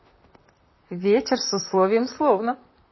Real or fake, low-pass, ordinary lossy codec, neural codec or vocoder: fake; 7.2 kHz; MP3, 24 kbps; vocoder, 44.1 kHz, 128 mel bands, Pupu-Vocoder